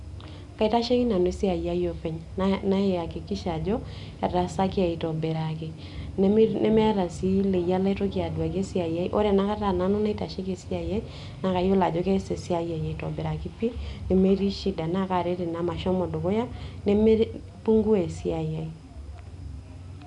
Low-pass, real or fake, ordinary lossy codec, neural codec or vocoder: 10.8 kHz; real; none; none